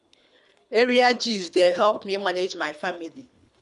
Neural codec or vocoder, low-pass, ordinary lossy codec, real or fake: codec, 24 kHz, 3 kbps, HILCodec; 10.8 kHz; none; fake